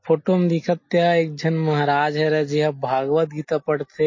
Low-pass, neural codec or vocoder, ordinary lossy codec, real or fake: 7.2 kHz; none; MP3, 32 kbps; real